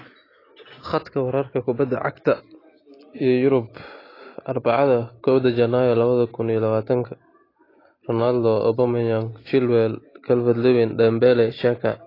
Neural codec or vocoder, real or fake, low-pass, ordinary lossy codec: none; real; 5.4 kHz; AAC, 24 kbps